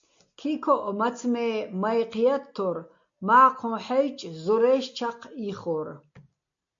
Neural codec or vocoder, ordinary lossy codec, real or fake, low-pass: none; MP3, 48 kbps; real; 7.2 kHz